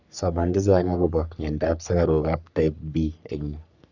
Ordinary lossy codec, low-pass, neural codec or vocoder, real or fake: none; 7.2 kHz; codec, 44.1 kHz, 3.4 kbps, Pupu-Codec; fake